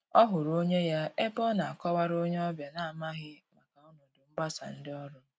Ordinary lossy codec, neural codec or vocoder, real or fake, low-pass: none; none; real; none